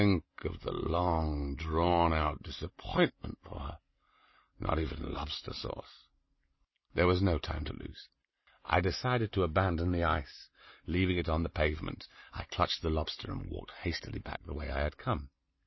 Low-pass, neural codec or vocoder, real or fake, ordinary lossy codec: 7.2 kHz; none; real; MP3, 24 kbps